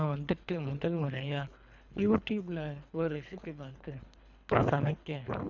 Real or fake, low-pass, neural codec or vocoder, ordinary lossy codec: fake; 7.2 kHz; codec, 24 kHz, 3 kbps, HILCodec; none